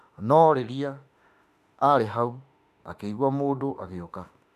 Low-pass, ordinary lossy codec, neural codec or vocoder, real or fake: 14.4 kHz; none; autoencoder, 48 kHz, 32 numbers a frame, DAC-VAE, trained on Japanese speech; fake